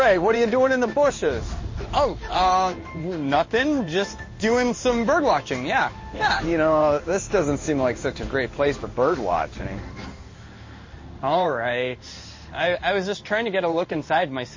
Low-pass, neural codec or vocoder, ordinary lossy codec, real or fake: 7.2 kHz; codec, 16 kHz in and 24 kHz out, 1 kbps, XY-Tokenizer; MP3, 32 kbps; fake